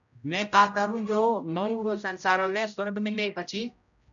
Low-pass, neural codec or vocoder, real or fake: 7.2 kHz; codec, 16 kHz, 0.5 kbps, X-Codec, HuBERT features, trained on general audio; fake